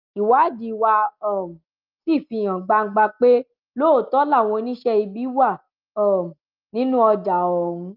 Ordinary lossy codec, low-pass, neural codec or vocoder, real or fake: Opus, 32 kbps; 5.4 kHz; none; real